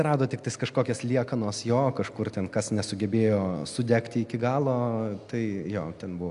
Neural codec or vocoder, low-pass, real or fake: none; 10.8 kHz; real